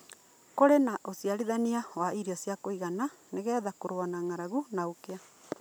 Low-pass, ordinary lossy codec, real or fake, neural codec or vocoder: none; none; real; none